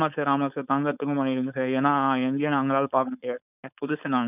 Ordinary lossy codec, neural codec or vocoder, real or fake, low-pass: none; codec, 16 kHz, 4.8 kbps, FACodec; fake; 3.6 kHz